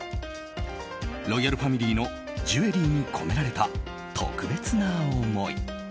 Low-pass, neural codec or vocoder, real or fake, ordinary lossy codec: none; none; real; none